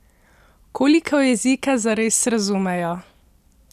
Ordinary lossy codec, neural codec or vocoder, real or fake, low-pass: none; none; real; 14.4 kHz